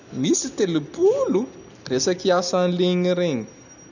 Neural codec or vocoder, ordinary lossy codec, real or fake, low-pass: none; none; real; 7.2 kHz